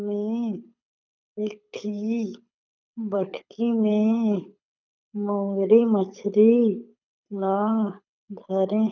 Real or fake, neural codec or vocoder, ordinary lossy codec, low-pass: fake; codec, 24 kHz, 6 kbps, HILCodec; none; 7.2 kHz